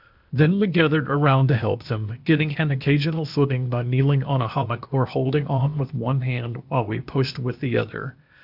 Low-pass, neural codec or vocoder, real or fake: 5.4 kHz; codec, 16 kHz, 0.8 kbps, ZipCodec; fake